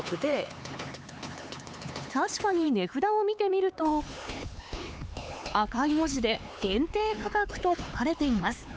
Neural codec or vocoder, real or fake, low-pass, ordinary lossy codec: codec, 16 kHz, 4 kbps, X-Codec, HuBERT features, trained on LibriSpeech; fake; none; none